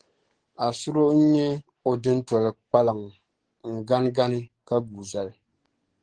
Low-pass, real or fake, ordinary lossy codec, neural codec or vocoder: 9.9 kHz; fake; Opus, 16 kbps; codec, 44.1 kHz, 7.8 kbps, DAC